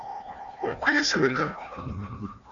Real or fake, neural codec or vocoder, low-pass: fake; codec, 16 kHz, 1 kbps, FunCodec, trained on Chinese and English, 50 frames a second; 7.2 kHz